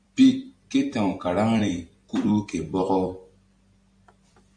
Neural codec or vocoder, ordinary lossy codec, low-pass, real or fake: vocoder, 44.1 kHz, 128 mel bands every 256 samples, BigVGAN v2; MP3, 64 kbps; 9.9 kHz; fake